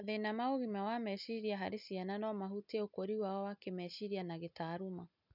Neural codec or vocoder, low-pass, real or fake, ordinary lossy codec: none; 5.4 kHz; real; none